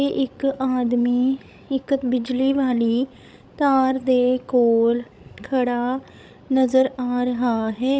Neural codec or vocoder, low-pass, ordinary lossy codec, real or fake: codec, 16 kHz, 16 kbps, FreqCodec, larger model; none; none; fake